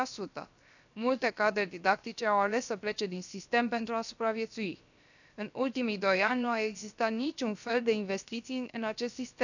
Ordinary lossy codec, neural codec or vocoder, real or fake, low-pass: none; codec, 16 kHz, 0.7 kbps, FocalCodec; fake; 7.2 kHz